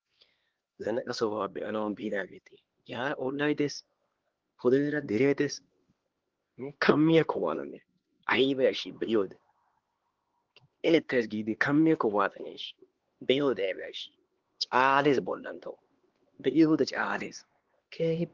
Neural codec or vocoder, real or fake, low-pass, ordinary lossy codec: codec, 16 kHz, 2 kbps, X-Codec, HuBERT features, trained on LibriSpeech; fake; 7.2 kHz; Opus, 16 kbps